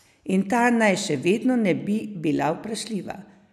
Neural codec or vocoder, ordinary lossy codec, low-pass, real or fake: none; none; 14.4 kHz; real